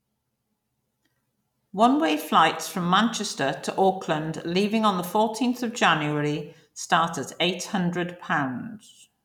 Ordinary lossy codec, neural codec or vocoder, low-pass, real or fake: none; none; 19.8 kHz; real